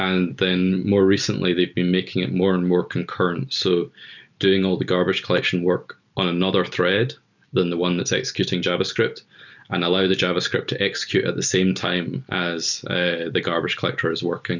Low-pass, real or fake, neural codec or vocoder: 7.2 kHz; real; none